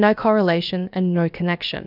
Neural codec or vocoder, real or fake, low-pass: codec, 16 kHz, about 1 kbps, DyCAST, with the encoder's durations; fake; 5.4 kHz